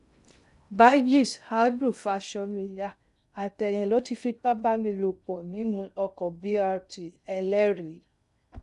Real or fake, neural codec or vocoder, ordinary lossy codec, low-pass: fake; codec, 16 kHz in and 24 kHz out, 0.6 kbps, FocalCodec, streaming, 2048 codes; none; 10.8 kHz